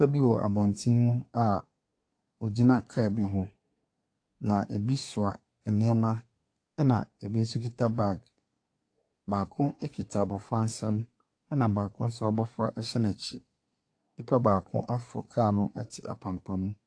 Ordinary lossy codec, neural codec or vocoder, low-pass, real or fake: AAC, 48 kbps; codec, 24 kHz, 1 kbps, SNAC; 9.9 kHz; fake